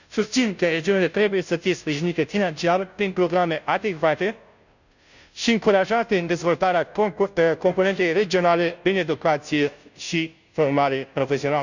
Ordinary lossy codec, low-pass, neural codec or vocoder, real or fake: none; 7.2 kHz; codec, 16 kHz, 0.5 kbps, FunCodec, trained on Chinese and English, 25 frames a second; fake